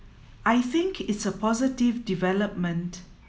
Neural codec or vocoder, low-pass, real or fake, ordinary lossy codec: none; none; real; none